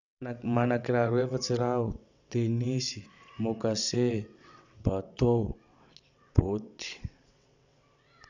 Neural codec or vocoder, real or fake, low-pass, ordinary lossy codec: vocoder, 22.05 kHz, 80 mel bands, WaveNeXt; fake; 7.2 kHz; none